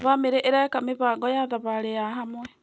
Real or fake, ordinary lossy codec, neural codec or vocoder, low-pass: real; none; none; none